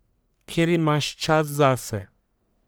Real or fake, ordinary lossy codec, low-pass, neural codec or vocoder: fake; none; none; codec, 44.1 kHz, 1.7 kbps, Pupu-Codec